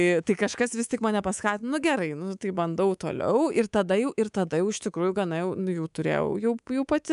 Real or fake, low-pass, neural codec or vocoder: fake; 10.8 kHz; codec, 24 kHz, 3.1 kbps, DualCodec